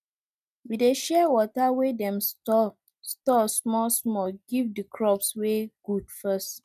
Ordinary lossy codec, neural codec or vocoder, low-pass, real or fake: none; none; 14.4 kHz; real